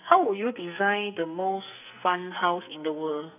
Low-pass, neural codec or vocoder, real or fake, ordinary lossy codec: 3.6 kHz; codec, 44.1 kHz, 2.6 kbps, SNAC; fake; none